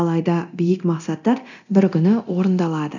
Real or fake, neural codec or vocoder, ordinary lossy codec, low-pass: fake; codec, 24 kHz, 0.9 kbps, DualCodec; none; 7.2 kHz